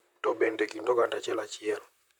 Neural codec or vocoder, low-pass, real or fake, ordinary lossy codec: vocoder, 44.1 kHz, 128 mel bands, Pupu-Vocoder; 19.8 kHz; fake; none